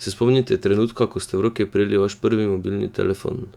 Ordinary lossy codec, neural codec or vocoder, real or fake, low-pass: Opus, 64 kbps; autoencoder, 48 kHz, 128 numbers a frame, DAC-VAE, trained on Japanese speech; fake; 19.8 kHz